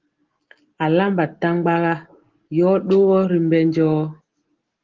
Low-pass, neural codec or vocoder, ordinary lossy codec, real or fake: 7.2 kHz; none; Opus, 16 kbps; real